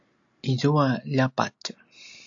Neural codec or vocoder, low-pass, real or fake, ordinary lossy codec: none; 7.2 kHz; real; AAC, 64 kbps